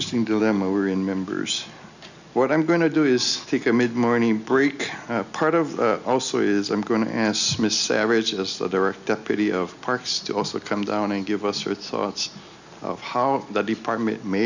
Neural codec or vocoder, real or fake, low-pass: none; real; 7.2 kHz